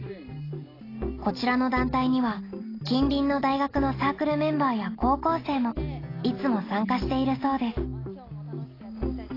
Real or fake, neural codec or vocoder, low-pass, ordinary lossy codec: real; none; 5.4 kHz; AAC, 24 kbps